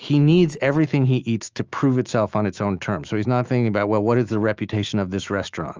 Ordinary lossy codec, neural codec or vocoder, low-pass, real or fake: Opus, 24 kbps; autoencoder, 48 kHz, 128 numbers a frame, DAC-VAE, trained on Japanese speech; 7.2 kHz; fake